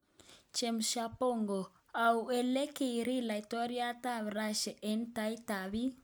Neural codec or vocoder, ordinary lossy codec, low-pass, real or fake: none; none; none; real